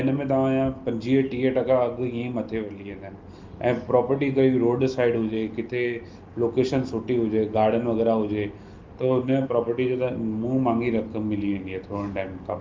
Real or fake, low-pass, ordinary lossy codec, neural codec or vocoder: real; 7.2 kHz; Opus, 32 kbps; none